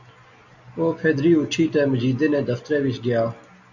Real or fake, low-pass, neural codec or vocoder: real; 7.2 kHz; none